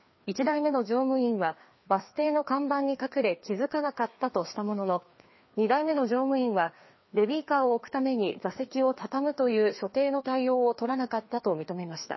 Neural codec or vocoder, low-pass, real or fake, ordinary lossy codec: codec, 16 kHz, 2 kbps, FreqCodec, larger model; 7.2 kHz; fake; MP3, 24 kbps